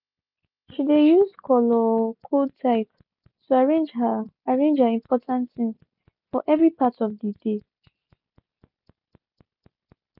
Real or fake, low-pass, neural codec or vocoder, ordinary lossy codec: real; 5.4 kHz; none; none